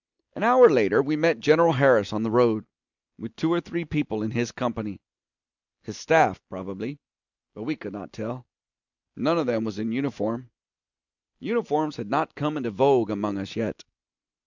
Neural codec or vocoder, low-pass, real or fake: none; 7.2 kHz; real